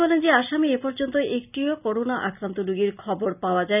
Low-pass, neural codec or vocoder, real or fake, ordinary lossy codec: 3.6 kHz; none; real; none